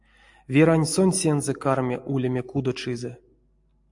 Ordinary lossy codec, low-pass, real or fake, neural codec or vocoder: AAC, 64 kbps; 10.8 kHz; real; none